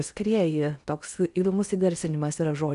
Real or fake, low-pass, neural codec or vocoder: fake; 10.8 kHz; codec, 16 kHz in and 24 kHz out, 0.8 kbps, FocalCodec, streaming, 65536 codes